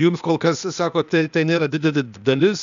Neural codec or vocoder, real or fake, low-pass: codec, 16 kHz, 0.8 kbps, ZipCodec; fake; 7.2 kHz